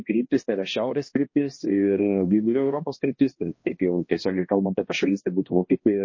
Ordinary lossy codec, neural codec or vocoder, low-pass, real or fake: MP3, 32 kbps; codec, 16 kHz, 1 kbps, X-Codec, HuBERT features, trained on balanced general audio; 7.2 kHz; fake